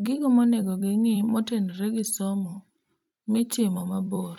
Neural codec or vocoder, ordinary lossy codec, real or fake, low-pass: vocoder, 44.1 kHz, 128 mel bands every 256 samples, BigVGAN v2; none; fake; 19.8 kHz